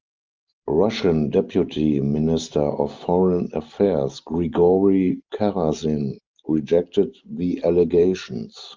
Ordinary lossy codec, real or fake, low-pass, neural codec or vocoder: Opus, 24 kbps; real; 7.2 kHz; none